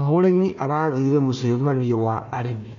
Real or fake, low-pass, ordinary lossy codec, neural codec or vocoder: fake; 7.2 kHz; MP3, 64 kbps; codec, 16 kHz, 1 kbps, FunCodec, trained on Chinese and English, 50 frames a second